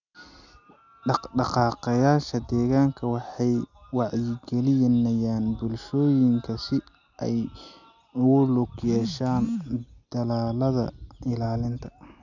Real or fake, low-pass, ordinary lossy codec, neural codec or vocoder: real; 7.2 kHz; none; none